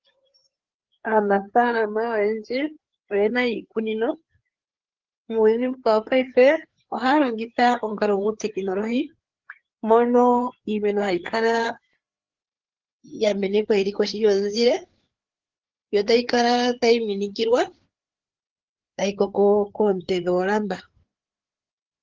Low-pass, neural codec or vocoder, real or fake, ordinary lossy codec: 7.2 kHz; codec, 16 kHz, 4 kbps, FreqCodec, larger model; fake; Opus, 16 kbps